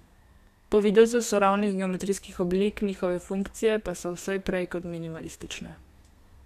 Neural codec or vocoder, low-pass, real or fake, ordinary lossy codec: codec, 32 kHz, 1.9 kbps, SNAC; 14.4 kHz; fake; none